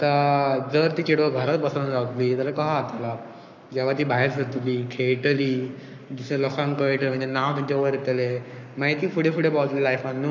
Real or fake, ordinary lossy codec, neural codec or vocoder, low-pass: fake; none; codec, 44.1 kHz, 7.8 kbps, Pupu-Codec; 7.2 kHz